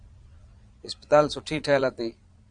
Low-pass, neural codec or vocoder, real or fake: 9.9 kHz; vocoder, 22.05 kHz, 80 mel bands, Vocos; fake